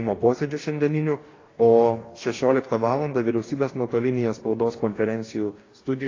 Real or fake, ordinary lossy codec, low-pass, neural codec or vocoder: fake; AAC, 32 kbps; 7.2 kHz; codec, 44.1 kHz, 2.6 kbps, DAC